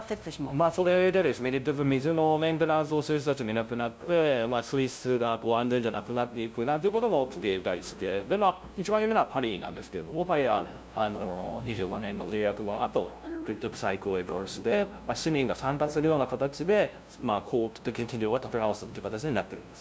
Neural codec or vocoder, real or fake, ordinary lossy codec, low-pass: codec, 16 kHz, 0.5 kbps, FunCodec, trained on LibriTTS, 25 frames a second; fake; none; none